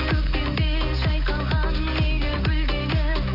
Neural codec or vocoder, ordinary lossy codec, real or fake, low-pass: none; AAC, 32 kbps; real; 5.4 kHz